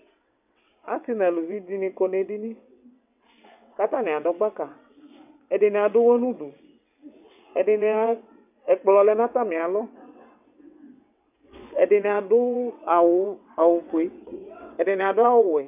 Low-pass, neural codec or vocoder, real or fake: 3.6 kHz; vocoder, 22.05 kHz, 80 mel bands, WaveNeXt; fake